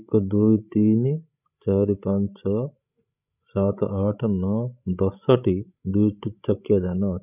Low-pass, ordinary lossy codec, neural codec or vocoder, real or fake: 3.6 kHz; none; codec, 16 kHz, 16 kbps, FreqCodec, larger model; fake